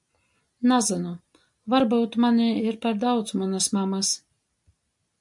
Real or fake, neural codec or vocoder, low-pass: real; none; 10.8 kHz